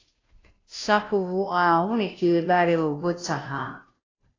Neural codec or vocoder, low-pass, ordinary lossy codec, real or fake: codec, 16 kHz, 0.5 kbps, FunCodec, trained on Chinese and English, 25 frames a second; 7.2 kHz; AAC, 32 kbps; fake